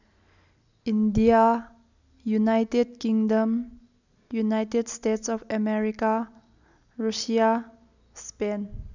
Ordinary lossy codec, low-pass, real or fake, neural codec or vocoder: none; 7.2 kHz; real; none